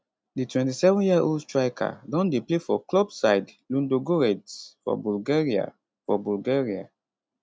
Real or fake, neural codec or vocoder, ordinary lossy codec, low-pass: real; none; none; none